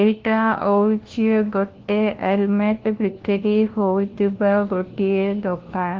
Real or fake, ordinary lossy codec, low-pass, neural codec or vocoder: fake; Opus, 16 kbps; 7.2 kHz; codec, 16 kHz, 1 kbps, FunCodec, trained on LibriTTS, 50 frames a second